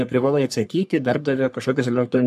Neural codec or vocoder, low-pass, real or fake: codec, 44.1 kHz, 3.4 kbps, Pupu-Codec; 14.4 kHz; fake